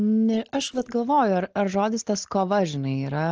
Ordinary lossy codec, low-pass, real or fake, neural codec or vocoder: Opus, 16 kbps; 7.2 kHz; real; none